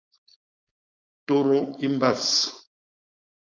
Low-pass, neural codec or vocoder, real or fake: 7.2 kHz; codec, 16 kHz, 4.8 kbps, FACodec; fake